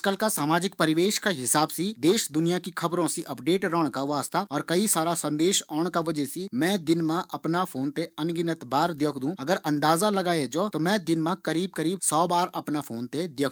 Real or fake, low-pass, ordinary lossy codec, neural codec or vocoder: fake; none; none; codec, 44.1 kHz, 7.8 kbps, DAC